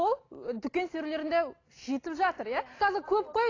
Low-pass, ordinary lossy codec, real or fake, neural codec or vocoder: 7.2 kHz; AAC, 32 kbps; real; none